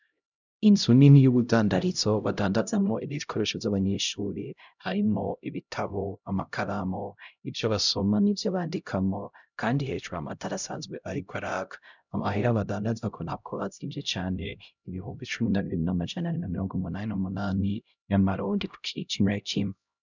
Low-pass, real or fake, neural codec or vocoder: 7.2 kHz; fake; codec, 16 kHz, 0.5 kbps, X-Codec, HuBERT features, trained on LibriSpeech